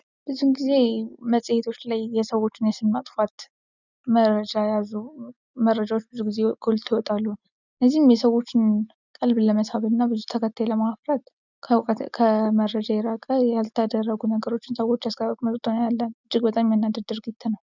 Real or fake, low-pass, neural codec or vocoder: real; 7.2 kHz; none